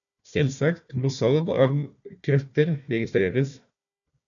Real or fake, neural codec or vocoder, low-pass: fake; codec, 16 kHz, 1 kbps, FunCodec, trained on Chinese and English, 50 frames a second; 7.2 kHz